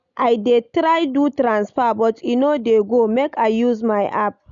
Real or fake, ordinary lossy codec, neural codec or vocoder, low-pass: real; none; none; 7.2 kHz